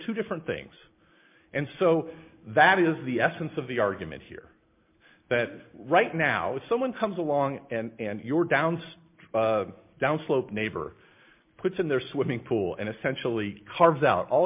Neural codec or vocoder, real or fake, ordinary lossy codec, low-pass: none; real; MP3, 32 kbps; 3.6 kHz